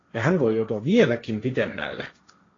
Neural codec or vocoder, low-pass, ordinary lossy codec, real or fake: codec, 16 kHz, 1.1 kbps, Voila-Tokenizer; 7.2 kHz; AAC, 32 kbps; fake